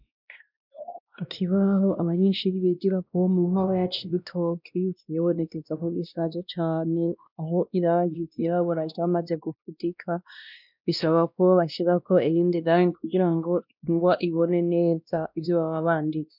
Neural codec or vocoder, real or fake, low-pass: codec, 16 kHz, 1 kbps, X-Codec, WavLM features, trained on Multilingual LibriSpeech; fake; 5.4 kHz